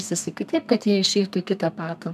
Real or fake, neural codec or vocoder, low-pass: fake; codec, 32 kHz, 1.9 kbps, SNAC; 14.4 kHz